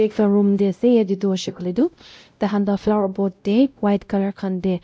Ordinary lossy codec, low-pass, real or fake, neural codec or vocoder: none; none; fake; codec, 16 kHz, 0.5 kbps, X-Codec, WavLM features, trained on Multilingual LibriSpeech